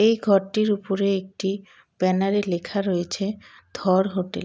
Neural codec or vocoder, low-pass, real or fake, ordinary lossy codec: none; none; real; none